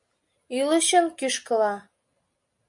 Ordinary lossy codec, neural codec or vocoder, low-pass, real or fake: MP3, 64 kbps; none; 10.8 kHz; real